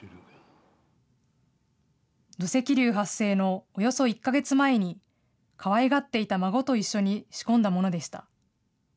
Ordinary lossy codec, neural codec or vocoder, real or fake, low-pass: none; none; real; none